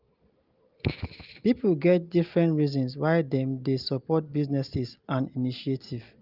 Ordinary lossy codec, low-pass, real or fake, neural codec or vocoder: Opus, 32 kbps; 5.4 kHz; real; none